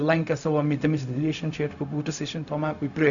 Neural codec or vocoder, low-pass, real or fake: codec, 16 kHz, 0.4 kbps, LongCat-Audio-Codec; 7.2 kHz; fake